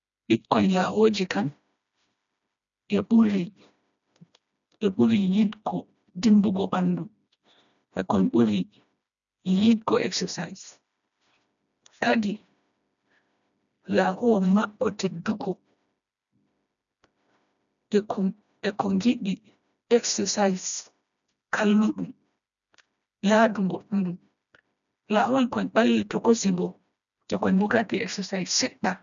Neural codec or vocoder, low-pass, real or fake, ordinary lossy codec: codec, 16 kHz, 1 kbps, FreqCodec, smaller model; 7.2 kHz; fake; none